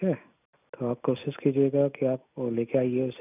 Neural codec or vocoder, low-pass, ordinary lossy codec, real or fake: none; 3.6 kHz; none; real